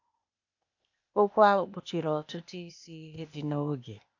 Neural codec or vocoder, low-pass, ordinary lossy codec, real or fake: codec, 16 kHz, 0.8 kbps, ZipCodec; 7.2 kHz; AAC, 48 kbps; fake